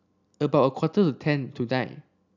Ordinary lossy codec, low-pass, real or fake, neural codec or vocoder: none; 7.2 kHz; real; none